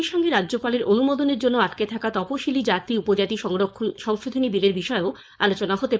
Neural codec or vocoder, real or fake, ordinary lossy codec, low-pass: codec, 16 kHz, 4.8 kbps, FACodec; fake; none; none